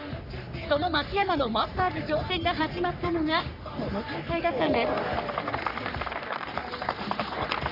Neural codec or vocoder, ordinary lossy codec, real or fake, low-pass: codec, 44.1 kHz, 3.4 kbps, Pupu-Codec; none; fake; 5.4 kHz